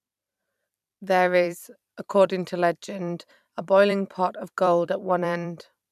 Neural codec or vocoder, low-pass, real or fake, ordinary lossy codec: vocoder, 44.1 kHz, 128 mel bands every 256 samples, BigVGAN v2; 14.4 kHz; fake; none